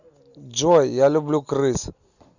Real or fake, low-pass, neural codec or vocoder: real; 7.2 kHz; none